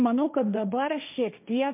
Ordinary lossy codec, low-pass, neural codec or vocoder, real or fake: MP3, 32 kbps; 3.6 kHz; codec, 16 kHz, 1.1 kbps, Voila-Tokenizer; fake